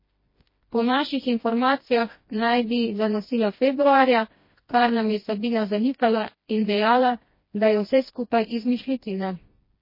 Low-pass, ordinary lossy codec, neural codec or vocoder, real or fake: 5.4 kHz; MP3, 24 kbps; codec, 16 kHz, 1 kbps, FreqCodec, smaller model; fake